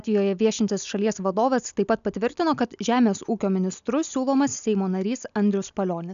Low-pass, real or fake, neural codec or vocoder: 7.2 kHz; real; none